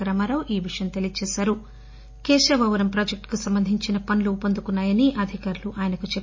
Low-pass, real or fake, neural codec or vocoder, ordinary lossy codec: 7.2 kHz; real; none; MP3, 32 kbps